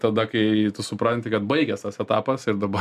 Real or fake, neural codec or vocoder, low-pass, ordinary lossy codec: real; none; 14.4 kHz; MP3, 96 kbps